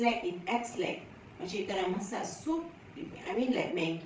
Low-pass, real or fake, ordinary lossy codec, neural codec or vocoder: none; fake; none; codec, 16 kHz, 16 kbps, FreqCodec, larger model